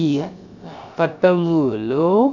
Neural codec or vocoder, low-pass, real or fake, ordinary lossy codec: codec, 16 kHz, 0.3 kbps, FocalCodec; 7.2 kHz; fake; none